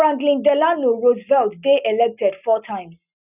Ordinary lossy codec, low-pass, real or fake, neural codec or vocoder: none; 3.6 kHz; real; none